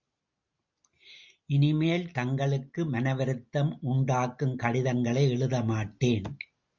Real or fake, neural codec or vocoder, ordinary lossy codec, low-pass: real; none; Opus, 64 kbps; 7.2 kHz